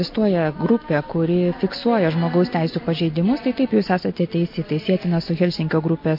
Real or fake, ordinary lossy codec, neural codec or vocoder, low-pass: real; MP3, 32 kbps; none; 5.4 kHz